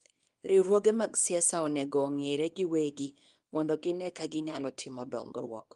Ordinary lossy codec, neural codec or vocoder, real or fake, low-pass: Opus, 32 kbps; codec, 24 kHz, 0.9 kbps, WavTokenizer, small release; fake; 10.8 kHz